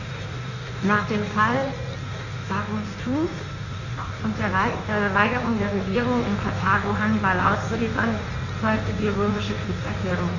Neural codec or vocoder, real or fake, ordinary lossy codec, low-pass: codec, 16 kHz in and 24 kHz out, 1.1 kbps, FireRedTTS-2 codec; fake; Opus, 64 kbps; 7.2 kHz